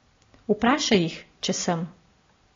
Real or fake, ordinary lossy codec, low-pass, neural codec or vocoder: real; AAC, 32 kbps; 7.2 kHz; none